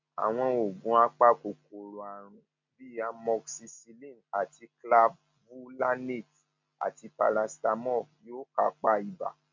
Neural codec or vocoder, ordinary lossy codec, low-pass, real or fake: none; MP3, 48 kbps; 7.2 kHz; real